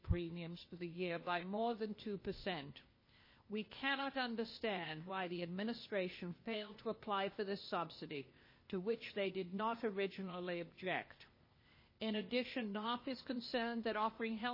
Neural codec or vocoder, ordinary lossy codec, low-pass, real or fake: codec, 16 kHz, 0.8 kbps, ZipCodec; MP3, 24 kbps; 5.4 kHz; fake